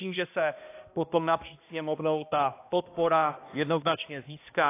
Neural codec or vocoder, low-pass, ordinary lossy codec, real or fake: codec, 16 kHz, 1 kbps, X-Codec, HuBERT features, trained on balanced general audio; 3.6 kHz; AAC, 24 kbps; fake